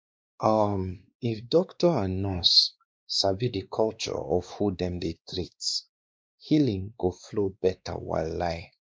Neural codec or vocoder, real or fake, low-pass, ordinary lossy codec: codec, 16 kHz, 2 kbps, X-Codec, WavLM features, trained on Multilingual LibriSpeech; fake; none; none